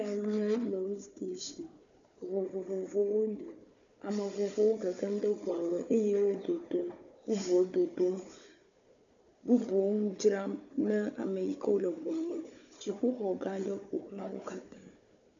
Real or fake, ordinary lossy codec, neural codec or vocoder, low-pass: fake; MP3, 96 kbps; codec, 16 kHz, 4 kbps, FunCodec, trained on Chinese and English, 50 frames a second; 7.2 kHz